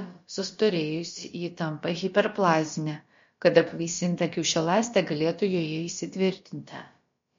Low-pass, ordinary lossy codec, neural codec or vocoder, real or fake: 7.2 kHz; MP3, 48 kbps; codec, 16 kHz, about 1 kbps, DyCAST, with the encoder's durations; fake